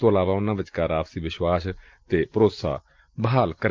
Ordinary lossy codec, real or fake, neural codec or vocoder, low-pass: Opus, 32 kbps; real; none; 7.2 kHz